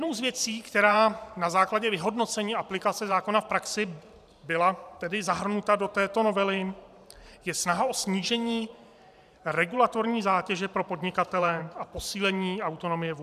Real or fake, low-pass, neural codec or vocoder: fake; 14.4 kHz; vocoder, 44.1 kHz, 128 mel bands every 512 samples, BigVGAN v2